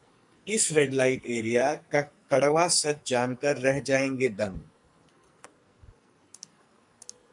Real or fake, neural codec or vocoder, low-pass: fake; codec, 44.1 kHz, 2.6 kbps, SNAC; 10.8 kHz